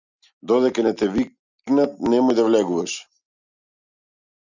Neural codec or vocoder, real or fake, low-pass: none; real; 7.2 kHz